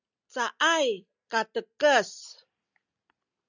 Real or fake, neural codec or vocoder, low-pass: real; none; 7.2 kHz